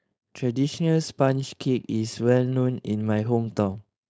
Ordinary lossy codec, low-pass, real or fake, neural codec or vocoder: none; none; fake; codec, 16 kHz, 4.8 kbps, FACodec